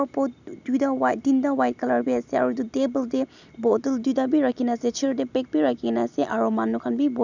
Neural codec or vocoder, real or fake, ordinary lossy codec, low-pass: none; real; none; 7.2 kHz